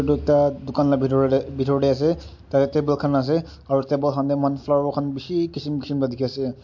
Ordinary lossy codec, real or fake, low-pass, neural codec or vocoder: MP3, 48 kbps; real; 7.2 kHz; none